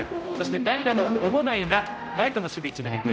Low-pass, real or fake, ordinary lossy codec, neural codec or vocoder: none; fake; none; codec, 16 kHz, 0.5 kbps, X-Codec, HuBERT features, trained on general audio